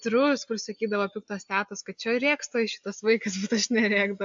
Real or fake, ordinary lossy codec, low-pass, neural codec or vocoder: real; MP3, 64 kbps; 7.2 kHz; none